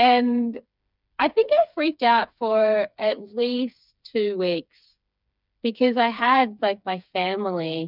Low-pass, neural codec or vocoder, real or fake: 5.4 kHz; codec, 16 kHz, 4 kbps, FreqCodec, smaller model; fake